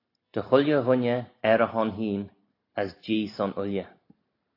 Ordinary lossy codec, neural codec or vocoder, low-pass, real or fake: AAC, 32 kbps; none; 5.4 kHz; real